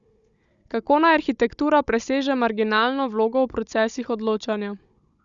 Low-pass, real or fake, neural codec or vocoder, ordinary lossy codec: 7.2 kHz; fake; codec, 16 kHz, 16 kbps, FunCodec, trained on Chinese and English, 50 frames a second; Opus, 64 kbps